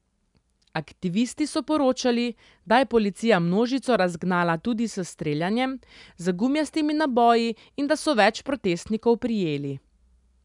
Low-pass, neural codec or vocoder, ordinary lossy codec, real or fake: 10.8 kHz; none; none; real